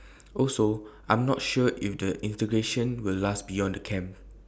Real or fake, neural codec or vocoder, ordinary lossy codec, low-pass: real; none; none; none